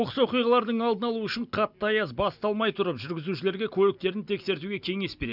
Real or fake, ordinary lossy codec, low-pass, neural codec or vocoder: real; none; 5.4 kHz; none